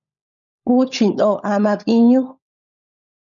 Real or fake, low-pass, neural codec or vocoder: fake; 7.2 kHz; codec, 16 kHz, 16 kbps, FunCodec, trained on LibriTTS, 50 frames a second